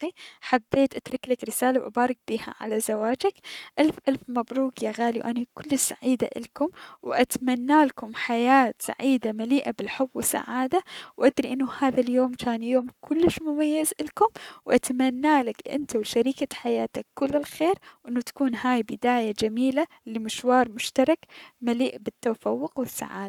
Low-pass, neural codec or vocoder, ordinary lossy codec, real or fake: 19.8 kHz; codec, 44.1 kHz, 7.8 kbps, Pupu-Codec; none; fake